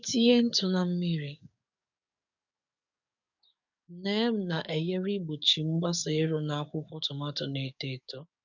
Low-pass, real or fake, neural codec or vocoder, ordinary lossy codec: 7.2 kHz; fake; codec, 44.1 kHz, 7.8 kbps, DAC; none